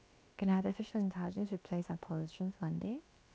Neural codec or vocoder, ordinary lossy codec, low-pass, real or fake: codec, 16 kHz, about 1 kbps, DyCAST, with the encoder's durations; none; none; fake